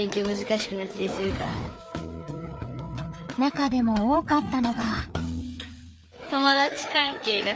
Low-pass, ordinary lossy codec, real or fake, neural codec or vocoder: none; none; fake; codec, 16 kHz, 4 kbps, FreqCodec, larger model